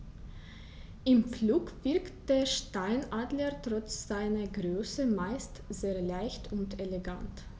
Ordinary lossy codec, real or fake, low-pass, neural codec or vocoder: none; real; none; none